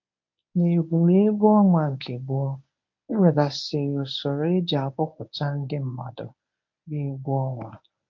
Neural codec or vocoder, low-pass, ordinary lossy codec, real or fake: codec, 24 kHz, 0.9 kbps, WavTokenizer, medium speech release version 1; 7.2 kHz; MP3, 48 kbps; fake